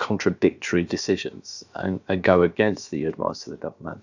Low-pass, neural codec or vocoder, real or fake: 7.2 kHz; codec, 16 kHz, about 1 kbps, DyCAST, with the encoder's durations; fake